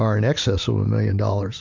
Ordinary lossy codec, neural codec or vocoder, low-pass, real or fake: MP3, 64 kbps; none; 7.2 kHz; real